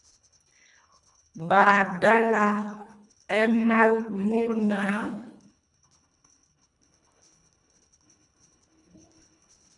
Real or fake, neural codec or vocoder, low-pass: fake; codec, 24 kHz, 1.5 kbps, HILCodec; 10.8 kHz